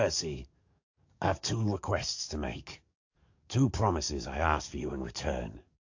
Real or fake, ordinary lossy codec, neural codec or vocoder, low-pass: fake; AAC, 48 kbps; codec, 44.1 kHz, 7.8 kbps, DAC; 7.2 kHz